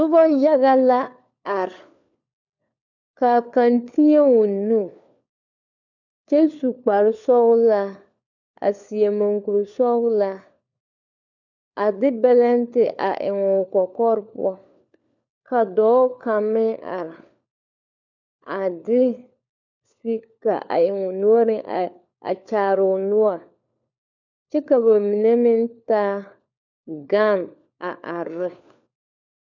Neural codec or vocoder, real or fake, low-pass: codec, 16 kHz, 4 kbps, FunCodec, trained on LibriTTS, 50 frames a second; fake; 7.2 kHz